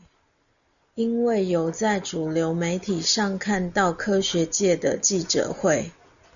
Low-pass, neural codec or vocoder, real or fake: 7.2 kHz; none; real